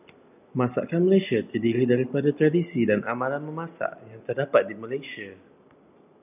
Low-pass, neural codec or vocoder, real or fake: 3.6 kHz; none; real